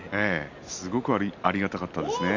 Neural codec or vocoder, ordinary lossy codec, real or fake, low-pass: none; MP3, 64 kbps; real; 7.2 kHz